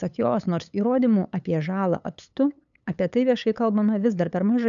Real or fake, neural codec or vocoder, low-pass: fake; codec, 16 kHz, 8 kbps, FunCodec, trained on LibriTTS, 25 frames a second; 7.2 kHz